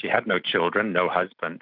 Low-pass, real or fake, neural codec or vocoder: 5.4 kHz; real; none